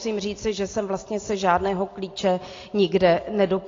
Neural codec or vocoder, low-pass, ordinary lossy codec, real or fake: none; 7.2 kHz; AAC, 32 kbps; real